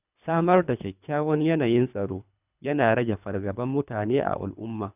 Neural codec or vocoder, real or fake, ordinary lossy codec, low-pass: codec, 24 kHz, 3 kbps, HILCodec; fake; none; 3.6 kHz